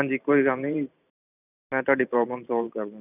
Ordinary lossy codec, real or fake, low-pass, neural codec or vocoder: none; fake; 3.6 kHz; vocoder, 44.1 kHz, 128 mel bands every 512 samples, BigVGAN v2